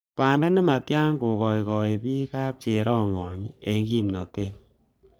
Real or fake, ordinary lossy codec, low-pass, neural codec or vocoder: fake; none; none; codec, 44.1 kHz, 3.4 kbps, Pupu-Codec